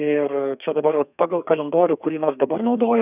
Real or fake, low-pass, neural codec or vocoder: fake; 3.6 kHz; codec, 32 kHz, 1.9 kbps, SNAC